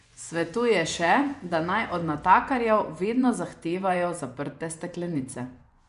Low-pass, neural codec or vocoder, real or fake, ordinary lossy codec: 10.8 kHz; vocoder, 24 kHz, 100 mel bands, Vocos; fake; none